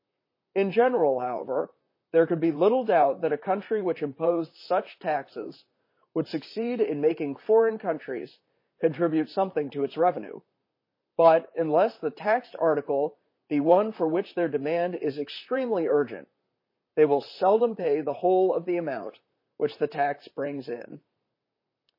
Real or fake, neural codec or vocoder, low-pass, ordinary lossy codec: fake; vocoder, 44.1 kHz, 128 mel bands, Pupu-Vocoder; 5.4 kHz; MP3, 24 kbps